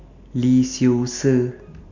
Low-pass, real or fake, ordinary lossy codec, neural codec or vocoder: 7.2 kHz; real; none; none